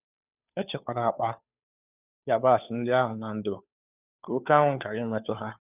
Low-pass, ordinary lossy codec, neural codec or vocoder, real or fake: 3.6 kHz; none; codec, 16 kHz, 2 kbps, FunCodec, trained on Chinese and English, 25 frames a second; fake